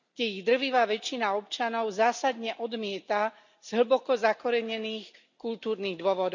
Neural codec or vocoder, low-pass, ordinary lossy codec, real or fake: none; 7.2 kHz; none; real